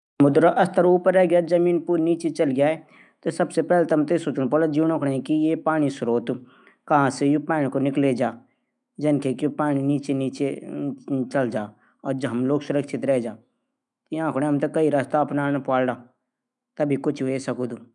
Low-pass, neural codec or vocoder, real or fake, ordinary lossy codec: 10.8 kHz; autoencoder, 48 kHz, 128 numbers a frame, DAC-VAE, trained on Japanese speech; fake; none